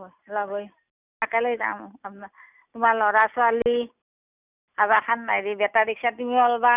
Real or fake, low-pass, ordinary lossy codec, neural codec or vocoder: real; 3.6 kHz; none; none